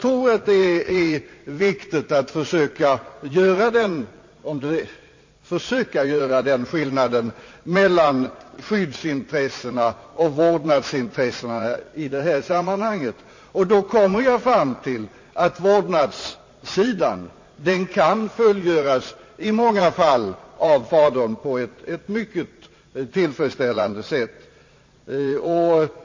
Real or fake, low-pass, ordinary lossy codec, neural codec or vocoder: fake; 7.2 kHz; MP3, 32 kbps; vocoder, 22.05 kHz, 80 mel bands, Vocos